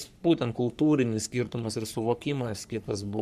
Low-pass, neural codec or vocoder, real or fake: 14.4 kHz; codec, 44.1 kHz, 3.4 kbps, Pupu-Codec; fake